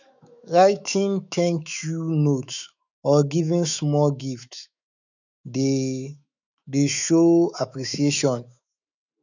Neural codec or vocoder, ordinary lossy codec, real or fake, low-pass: autoencoder, 48 kHz, 128 numbers a frame, DAC-VAE, trained on Japanese speech; none; fake; 7.2 kHz